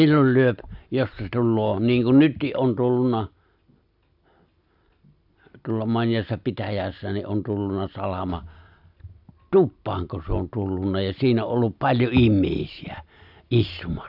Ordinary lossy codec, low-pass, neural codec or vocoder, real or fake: none; 5.4 kHz; none; real